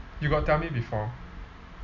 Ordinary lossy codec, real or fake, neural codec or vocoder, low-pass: none; real; none; 7.2 kHz